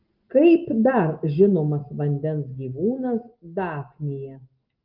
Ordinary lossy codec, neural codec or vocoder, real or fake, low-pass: Opus, 24 kbps; none; real; 5.4 kHz